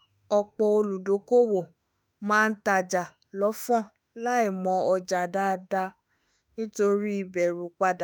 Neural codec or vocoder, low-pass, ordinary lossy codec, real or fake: autoencoder, 48 kHz, 32 numbers a frame, DAC-VAE, trained on Japanese speech; none; none; fake